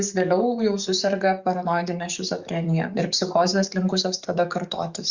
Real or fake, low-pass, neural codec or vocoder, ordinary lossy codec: fake; 7.2 kHz; codec, 44.1 kHz, 7.8 kbps, DAC; Opus, 64 kbps